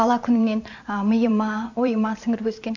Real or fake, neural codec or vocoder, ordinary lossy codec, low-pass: fake; vocoder, 44.1 kHz, 128 mel bands every 512 samples, BigVGAN v2; AAC, 48 kbps; 7.2 kHz